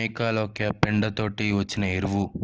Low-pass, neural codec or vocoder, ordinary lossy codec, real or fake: 7.2 kHz; none; Opus, 16 kbps; real